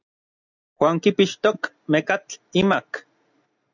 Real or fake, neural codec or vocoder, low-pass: real; none; 7.2 kHz